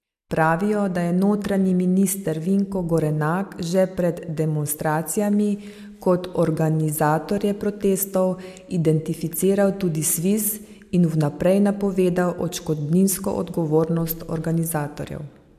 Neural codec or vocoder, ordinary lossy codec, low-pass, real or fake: none; MP3, 96 kbps; 14.4 kHz; real